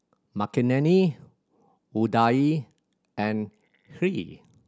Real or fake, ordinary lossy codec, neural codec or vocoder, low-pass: real; none; none; none